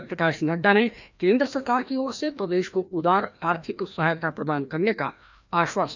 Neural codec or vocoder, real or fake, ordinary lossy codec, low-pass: codec, 16 kHz, 1 kbps, FreqCodec, larger model; fake; none; 7.2 kHz